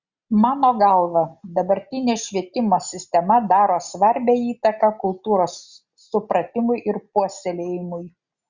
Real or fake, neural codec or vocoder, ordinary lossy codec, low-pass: real; none; Opus, 64 kbps; 7.2 kHz